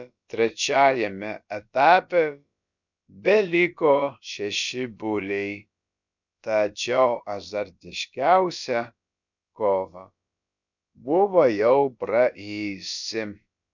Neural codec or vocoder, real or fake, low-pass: codec, 16 kHz, about 1 kbps, DyCAST, with the encoder's durations; fake; 7.2 kHz